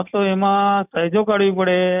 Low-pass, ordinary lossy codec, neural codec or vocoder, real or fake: 3.6 kHz; none; none; real